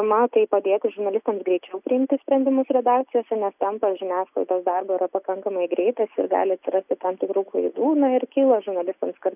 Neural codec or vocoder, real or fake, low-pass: none; real; 3.6 kHz